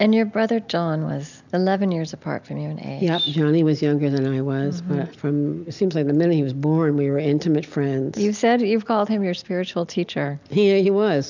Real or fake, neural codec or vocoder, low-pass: real; none; 7.2 kHz